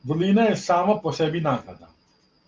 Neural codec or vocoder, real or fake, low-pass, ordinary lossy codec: none; real; 7.2 kHz; Opus, 32 kbps